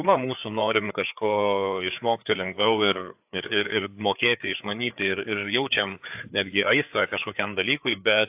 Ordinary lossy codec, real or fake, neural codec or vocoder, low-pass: AAC, 32 kbps; fake; codec, 16 kHz, 4 kbps, FreqCodec, larger model; 3.6 kHz